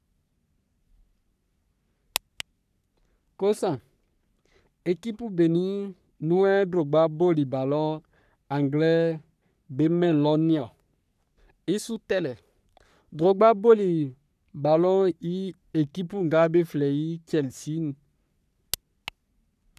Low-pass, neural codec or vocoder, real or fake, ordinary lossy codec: 14.4 kHz; codec, 44.1 kHz, 3.4 kbps, Pupu-Codec; fake; none